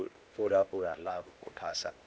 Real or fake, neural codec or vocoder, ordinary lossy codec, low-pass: fake; codec, 16 kHz, 0.8 kbps, ZipCodec; none; none